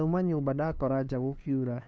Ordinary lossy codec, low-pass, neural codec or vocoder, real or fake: none; none; codec, 16 kHz, 2 kbps, FunCodec, trained on LibriTTS, 25 frames a second; fake